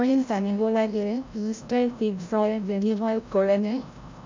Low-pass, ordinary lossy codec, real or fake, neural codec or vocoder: 7.2 kHz; MP3, 64 kbps; fake; codec, 16 kHz, 0.5 kbps, FreqCodec, larger model